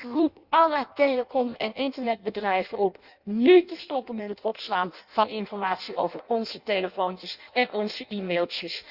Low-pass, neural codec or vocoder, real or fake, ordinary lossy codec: 5.4 kHz; codec, 16 kHz in and 24 kHz out, 0.6 kbps, FireRedTTS-2 codec; fake; Opus, 64 kbps